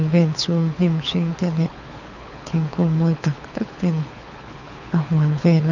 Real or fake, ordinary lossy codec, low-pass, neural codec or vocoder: fake; none; 7.2 kHz; codec, 24 kHz, 6 kbps, HILCodec